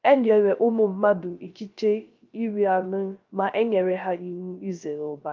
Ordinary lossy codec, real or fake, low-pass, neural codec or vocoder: Opus, 24 kbps; fake; 7.2 kHz; codec, 16 kHz, 0.3 kbps, FocalCodec